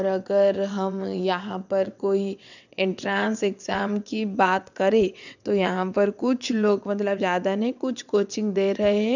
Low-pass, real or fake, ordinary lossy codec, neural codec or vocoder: 7.2 kHz; fake; none; vocoder, 22.05 kHz, 80 mel bands, WaveNeXt